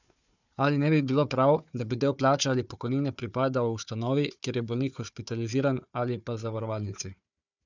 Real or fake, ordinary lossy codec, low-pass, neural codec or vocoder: fake; none; 7.2 kHz; codec, 16 kHz, 4 kbps, FunCodec, trained on Chinese and English, 50 frames a second